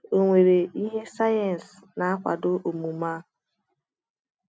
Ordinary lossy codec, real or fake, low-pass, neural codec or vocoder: none; real; none; none